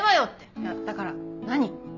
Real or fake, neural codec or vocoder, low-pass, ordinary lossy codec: real; none; 7.2 kHz; none